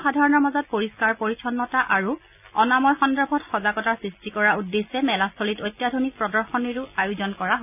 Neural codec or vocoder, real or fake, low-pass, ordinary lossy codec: none; real; 3.6 kHz; none